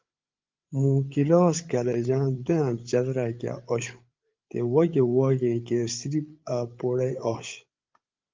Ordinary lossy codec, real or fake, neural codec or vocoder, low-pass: Opus, 32 kbps; fake; codec, 16 kHz, 8 kbps, FreqCodec, larger model; 7.2 kHz